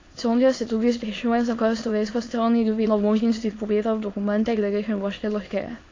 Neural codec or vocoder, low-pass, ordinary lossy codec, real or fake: autoencoder, 22.05 kHz, a latent of 192 numbers a frame, VITS, trained on many speakers; 7.2 kHz; AAC, 32 kbps; fake